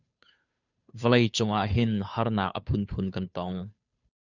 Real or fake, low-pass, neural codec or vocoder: fake; 7.2 kHz; codec, 16 kHz, 2 kbps, FunCodec, trained on Chinese and English, 25 frames a second